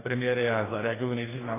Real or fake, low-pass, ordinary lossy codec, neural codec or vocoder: fake; 3.6 kHz; AAC, 16 kbps; codec, 44.1 kHz, 2.6 kbps, DAC